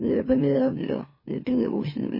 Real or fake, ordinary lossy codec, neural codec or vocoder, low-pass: fake; MP3, 24 kbps; autoencoder, 44.1 kHz, a latent of 192 numbers a frame, MeloTTS; 5.4 kHz